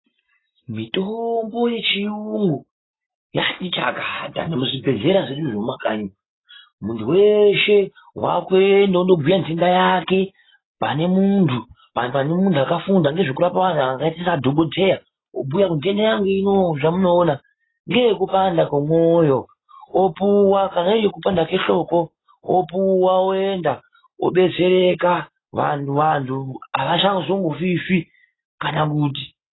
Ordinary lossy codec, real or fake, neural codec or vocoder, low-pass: AAC, 16 kbps; real; none; 7.2 kHz